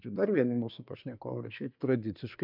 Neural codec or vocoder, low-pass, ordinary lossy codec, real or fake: codec, 24 kHz, 1 kbps, SNAC; 5.4 kHz; MP3, 48 kbps; fake